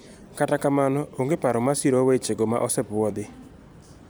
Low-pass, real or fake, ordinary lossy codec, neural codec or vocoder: none; fake; none; vocoder, 44.1 kHz, 128 mel bands every 256 samples, BigVGAN v2